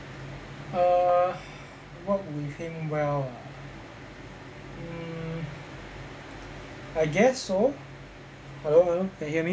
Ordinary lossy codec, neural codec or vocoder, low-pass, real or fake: none; none; none; real